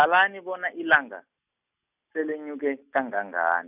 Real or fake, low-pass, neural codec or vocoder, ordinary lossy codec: real; 3.6 kHz; none; none